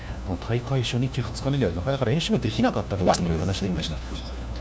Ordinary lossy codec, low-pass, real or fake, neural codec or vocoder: none; none; fake; codec, 16 kHz, 1 kbps, FunCodec, trained on LibriTTS, 50 frames a second